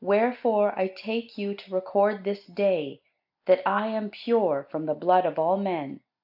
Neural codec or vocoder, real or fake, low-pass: none; real; 5.4 kHz